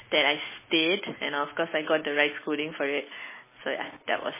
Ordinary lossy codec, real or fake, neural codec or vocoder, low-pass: MP3, 16 kbps; real; none; 3.6 kHz